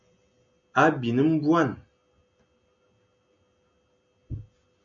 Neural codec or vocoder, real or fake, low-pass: none; real; 7.2 kHz